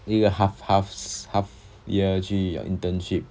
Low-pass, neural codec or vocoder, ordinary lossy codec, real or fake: none; none; none; real